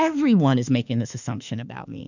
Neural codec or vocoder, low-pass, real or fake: autoencoder, 48 kHz, 32 numbers a frame, DAC-VAE, trained on Japanese speech; 7.2 kHz; fake